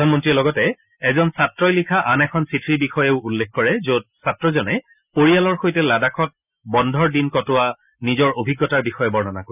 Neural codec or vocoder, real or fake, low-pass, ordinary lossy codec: none; real; 3.6 kHz; none